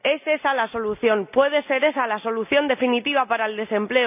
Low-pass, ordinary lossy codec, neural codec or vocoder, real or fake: 3.6 kHz; none; none; real